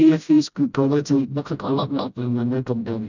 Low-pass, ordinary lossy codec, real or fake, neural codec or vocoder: 7.2 kHz; none; fake; codec, 16 kHz, 0.5 kbps, FreqCodec, smaller model